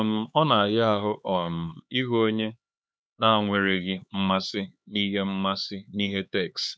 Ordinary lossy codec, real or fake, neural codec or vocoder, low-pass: none; fake; codec, 16 kHz, 4 kbps, X-Codec, HuBERT features, trained on LibriSpeech; none